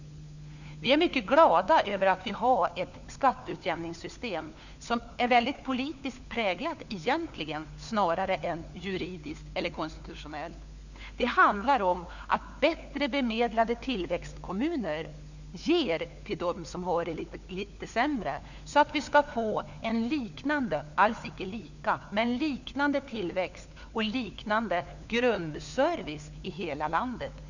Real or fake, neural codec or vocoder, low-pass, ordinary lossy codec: fake; codec, 16 kHz, 4 kbps, FunCodec, trained on LibriTTS, 50 frames a second; 7.2 kHz; none